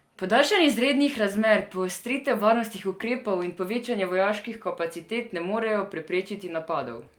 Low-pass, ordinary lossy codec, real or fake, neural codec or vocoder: 19.8 kHz; Opus, 32 kbps; real; none